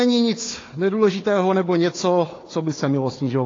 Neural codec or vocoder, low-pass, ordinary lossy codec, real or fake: codec, 16 kHz, 4 kbps, FunCodec, trained on Chinese and English, 50 frames a second; 7.2 kHz; AAC, 32 kbps; fake